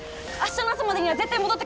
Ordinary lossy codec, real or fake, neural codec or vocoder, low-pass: none; real; none; none